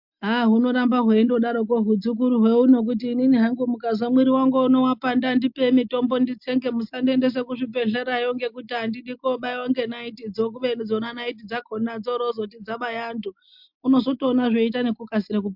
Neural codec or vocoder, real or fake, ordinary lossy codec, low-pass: none; real; MP3, 48 kbps; 5.4 kHz